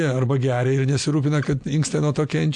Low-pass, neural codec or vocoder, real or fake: 9.9 kHz; none; real